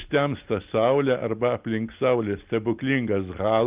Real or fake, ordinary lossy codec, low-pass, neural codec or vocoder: real; Opus, 32 kbps; 3.6 kHz; none